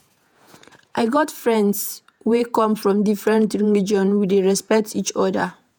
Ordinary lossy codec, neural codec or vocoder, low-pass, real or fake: none; vocoder, 48 kHz, 128 mel bands, Vocos; none; fake